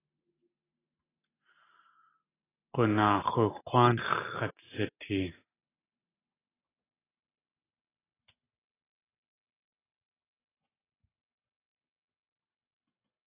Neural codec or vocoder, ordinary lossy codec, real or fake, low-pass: none; AAC, 16 kbps; real; 3.6 kHz